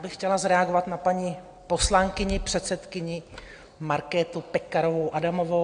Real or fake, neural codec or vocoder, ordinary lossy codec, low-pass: real; none; AAC, 48 kbps; 9.9 kHz